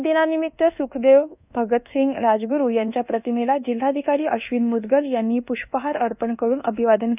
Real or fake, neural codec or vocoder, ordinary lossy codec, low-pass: fake; codec, 24 kHz, 1.2 kbps, DualCodec; none; 3.6 kHz